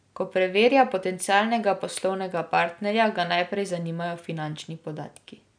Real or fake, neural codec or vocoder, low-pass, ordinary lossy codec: real; none; 9.9 kHz; none